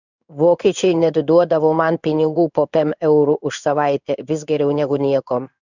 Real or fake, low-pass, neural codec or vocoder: fake; 7.2 kHz; codec, 16 kHz in and 24 kHz out, 1 kbps, XY-Tokenizer